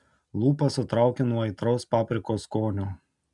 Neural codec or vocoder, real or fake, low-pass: none; real; 10.8 kHz